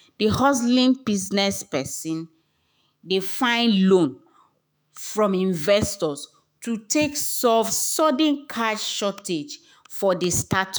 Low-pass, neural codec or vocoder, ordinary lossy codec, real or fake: none; autoencoder, 48 kHz, 128 numbers a frame, DAC-VAE, trained on Japanese speech; none; fake